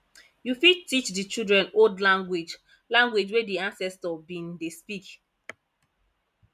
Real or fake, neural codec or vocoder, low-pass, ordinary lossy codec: real; none; 14.4 kHz; none